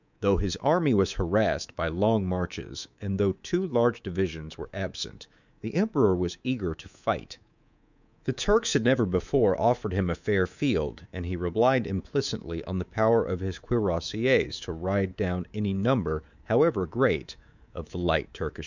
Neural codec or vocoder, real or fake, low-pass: codec, 24 kHz, 3.1 kbps, DualCodec; fake; 7.2 kHz